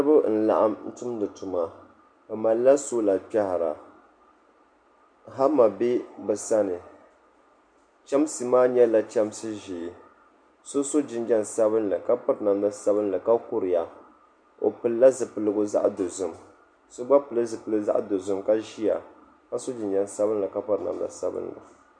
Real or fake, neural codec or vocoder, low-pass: real; none; 9.9 kHz